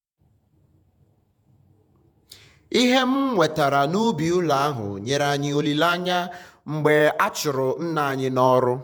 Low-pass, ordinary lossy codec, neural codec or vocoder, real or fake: none; none; vocoder, 48 kHz, 128 mel bands, Vocos; fake